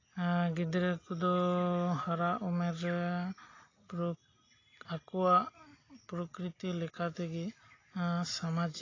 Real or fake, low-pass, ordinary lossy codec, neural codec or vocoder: real; 7.2 kHz; AAC, 32 kbps; none